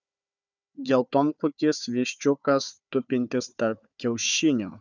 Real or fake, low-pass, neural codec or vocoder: fake; 7.2 kHz; codec, 16 kHz, 4 kbps, FunCodec, trained on Chinese and English, 50 frames a second